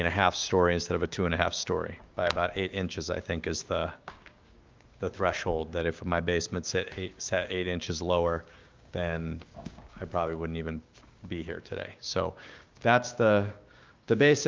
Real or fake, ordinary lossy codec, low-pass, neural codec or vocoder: fake; Opus, 24 kbps; 7.2 kHz; codec, 16 kHz, 6 kbps, DAC